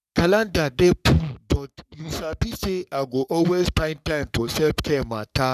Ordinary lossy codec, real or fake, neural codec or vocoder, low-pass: none; fake; codec, 44.1 kHz, 3.4 kbps, Pupu-Codec; 14.4 kHz